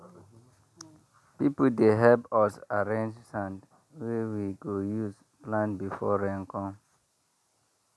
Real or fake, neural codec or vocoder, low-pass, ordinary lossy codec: real; none; none; none